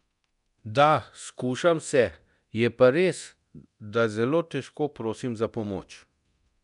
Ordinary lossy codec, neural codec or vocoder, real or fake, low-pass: none; codec, 24 kHz, 0.9 kbps, DualCodec; fake; 10.8 kHz